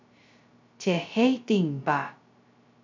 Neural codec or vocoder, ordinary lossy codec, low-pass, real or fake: codec, 16 kHz, 0.2 kbps, FocalCodec; MP3, 64 kbps; 7.2 kHz; fake